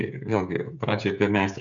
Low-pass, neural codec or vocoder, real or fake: 7.2 kHz; codec, 16 kHz, 8 kbps, FreqCodec, smaller model; fake